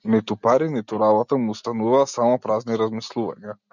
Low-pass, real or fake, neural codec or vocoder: 7.2 kHz; real; none